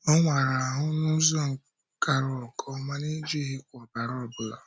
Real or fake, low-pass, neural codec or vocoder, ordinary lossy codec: real; none; none; none